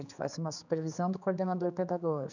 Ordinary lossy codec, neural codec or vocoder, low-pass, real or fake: none; codec, 16 kHz, 4 kbps, X-Codec, HuBERT features, trained on general audio; 7.2 kHz; fake